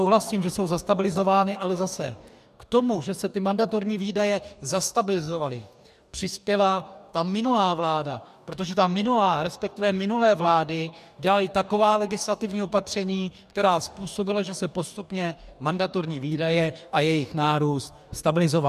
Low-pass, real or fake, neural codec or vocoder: 14.4 kHz; fake; codec, 44.1 kHz, 2.6 kbps, DAC